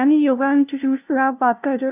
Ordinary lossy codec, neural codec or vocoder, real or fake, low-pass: none; codec, 16 kHz, 0.5 kbps, FunCodec, trained on LibriTTS, 25 frames a second; fake; 3.6 kHz